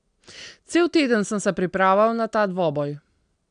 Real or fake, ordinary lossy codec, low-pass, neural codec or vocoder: real; none; 9.9 kHz; none